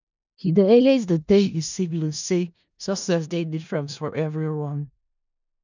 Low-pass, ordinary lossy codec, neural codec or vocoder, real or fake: 7.2 kHz; none; codec, 16 kHz in and 24 kHz out, 0.4 kbps, LongCat-Audio-Codec, four codebook decoder; fake